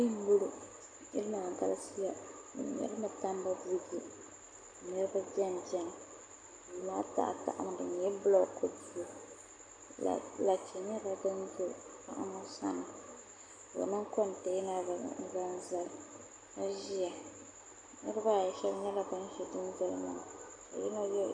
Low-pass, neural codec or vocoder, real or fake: 9.9 kHz; none; real